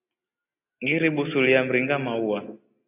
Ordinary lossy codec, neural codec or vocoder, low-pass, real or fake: AAC, 32 kbps; none; 3.6 kHz; real